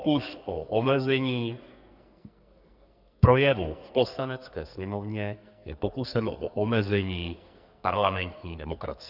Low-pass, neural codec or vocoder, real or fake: 5.4 kHz; codec, 44.1 kHz, 2.6 kbps, SNAC; fake